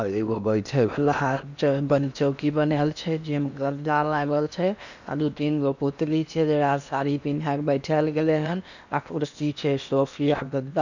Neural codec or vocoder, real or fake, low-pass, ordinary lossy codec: codec, 16 kHz in and 24 kHz out, 0.8 kbps, FocalCodec, streaming, 65536 codes; fake; 7.2 kHz; none